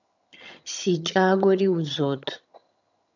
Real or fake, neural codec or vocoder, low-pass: fake; vocoder, 22.05 kHz, 80 mel bands, HiFi-GAN; 7.2 kHz